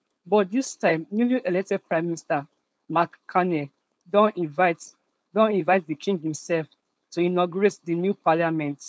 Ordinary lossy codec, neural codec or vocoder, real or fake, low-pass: none; codec, 16 kHz, 4.8 kbps, FACodec; fake; none